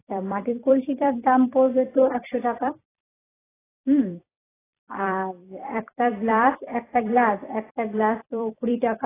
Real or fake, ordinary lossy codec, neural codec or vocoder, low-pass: fake; AAC, 16 kbps; vocoder, 44.1 kHz, 128 mel bands every 256 samples, BigVGAN v2; 3.6 kHz